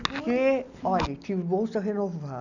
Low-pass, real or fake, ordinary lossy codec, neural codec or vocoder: 7.2 kHz; real; none; none